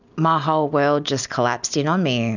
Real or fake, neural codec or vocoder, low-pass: real; none; 7.2 kHz